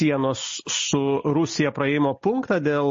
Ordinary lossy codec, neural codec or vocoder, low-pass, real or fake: MP3, 32 kbps; none; 7.2 kHz; real